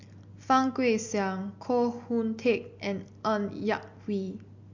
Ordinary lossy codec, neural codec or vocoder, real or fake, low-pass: MP3, 48 kbps; none; real; 7.2 kHz